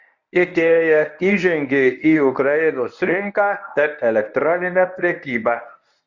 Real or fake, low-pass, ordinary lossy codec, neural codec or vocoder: fake; 7.2 kHz; Opus, 64 kbps; codec, 24 kHz, 0.9 kbps, WavTokenizer, medium speech release version 1